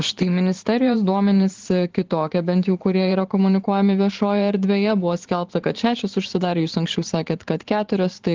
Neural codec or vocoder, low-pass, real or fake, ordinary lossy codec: vocoder, 44.1 kHz, 128 mel bands every 512 samples, BigVGAN v2; 7.2 kHz; fake; Opus, 16 kbps